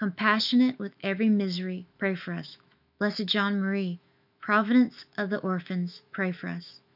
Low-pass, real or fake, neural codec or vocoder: 5.4 kHz; fake; autoencoder, 48 kHz, 128 numbers a frame, DAC-VAE, trained on Japanese speech